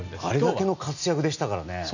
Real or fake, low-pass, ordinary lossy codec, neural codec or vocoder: real; 7.2 kHz; none; none